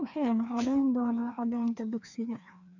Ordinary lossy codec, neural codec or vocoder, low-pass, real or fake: none; codec, 16 kHz, 2 kbps, FreqCodec, larger model; 7.2 kHz; fake